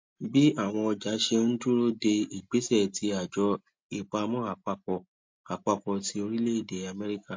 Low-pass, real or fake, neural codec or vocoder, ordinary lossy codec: 7.2 kHz; real; none; MP3, 48 kbps